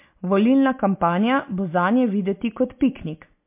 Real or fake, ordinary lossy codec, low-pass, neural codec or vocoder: real; MP3, 32 kbps; 3.6 kHz; none